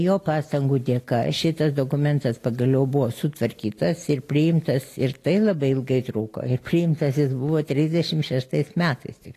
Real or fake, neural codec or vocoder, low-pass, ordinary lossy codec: real; none; 14.4 kHz; AAC, 48 kbps